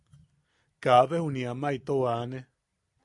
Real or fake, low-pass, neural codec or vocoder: real; 10.8 kHz; none